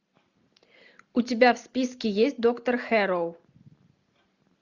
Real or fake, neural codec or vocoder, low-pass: real; none; 7.2 kHz